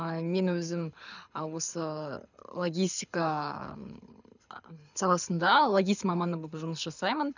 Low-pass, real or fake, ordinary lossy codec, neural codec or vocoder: 7.2 kHz; fake; none; codec, 24 kHz, 6 kbps, HILCodec